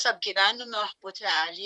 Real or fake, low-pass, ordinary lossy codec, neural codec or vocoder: fake; 10.8 kHz; Opus, 64 kbps; vocoder, 44.1 kHz, 128 mel bands, Pupu-Vocoder